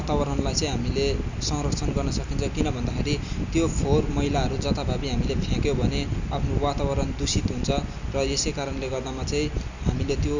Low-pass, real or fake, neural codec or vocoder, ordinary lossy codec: 7.2 kHz; real; none; Opus, 64 kbps